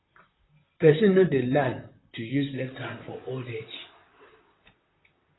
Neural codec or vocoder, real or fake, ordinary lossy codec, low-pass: vocoder, 44.1 kHz, 128 mel bands, Pupu-Vocoder; fake; AAC, 16 kbps; 7.2 kHz